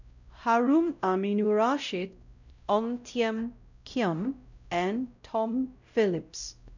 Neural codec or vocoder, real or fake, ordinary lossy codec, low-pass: codec, 16 kHz, 0.5 kbps, X-Codec, WavLM features, trained on Multilingual LibriSpeech; fake; none; 7.2 kHz